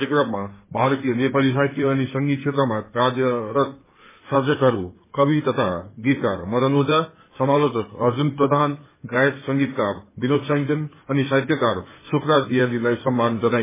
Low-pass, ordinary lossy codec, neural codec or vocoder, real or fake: 3.6 kHz; MP3, 16 kbps; codec, 16 kHz in and 24 kHz out, 2.2 kbps, FireRedTTS-2 codec; fake